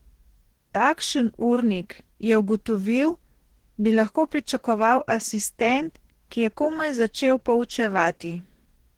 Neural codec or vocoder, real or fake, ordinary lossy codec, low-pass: codec, 44.1 kHz, 2.6 kbps, DAC; fake; Opus, 16 kbps; 19.8 kHz